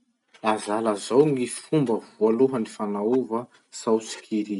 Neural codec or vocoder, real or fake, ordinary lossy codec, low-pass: none; real; none; 10.8 kHz